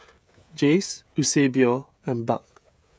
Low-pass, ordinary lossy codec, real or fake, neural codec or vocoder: none; none; fake; codec, 16 kHz, 16 kbps, FreqCodec, smaller model